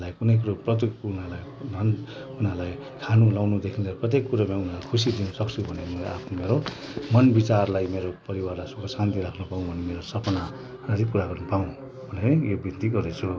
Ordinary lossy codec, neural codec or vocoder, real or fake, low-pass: none; none; real; none